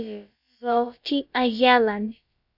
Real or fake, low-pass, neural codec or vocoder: fake; 5.4 kHz; codec, 16 kHz, about 1 kbps, DyCAST, with the encoder's durations